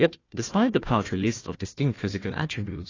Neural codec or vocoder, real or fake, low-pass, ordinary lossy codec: codec, 16 kHz, 1 kbps, FunCodec, trained on Chinese and English, 50 frames a second; fake; 7.2 kHz; AAC, 32 kbps